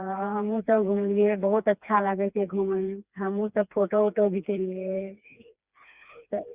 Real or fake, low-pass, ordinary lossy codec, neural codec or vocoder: fake; 3.6 kHz; Opus, 64 kbps; codec, 16 kHz, 2 kbps, FreqCodec, smaller model